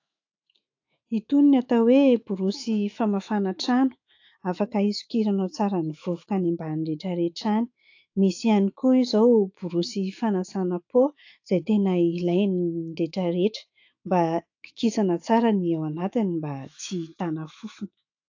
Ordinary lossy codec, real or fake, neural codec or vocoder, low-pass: AAC, 48 kbps; fake; autoencoder, 48 kHz, 128 numbers a frame, DAC-VAE, trained on Japanese speech; 7.2 kHz